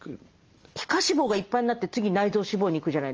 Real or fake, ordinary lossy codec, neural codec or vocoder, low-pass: real; Opus, 24 kbps; none; 7.2 kHz